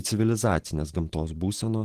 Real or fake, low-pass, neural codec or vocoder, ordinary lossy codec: real; 14.4 kHz; none; Opus, 16 kbps